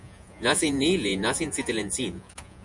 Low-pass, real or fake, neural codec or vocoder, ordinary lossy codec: 10.8 kHz; fake; vocoder, 48 kHz, 128 mel bands, Vocos; MP3, 96 kbps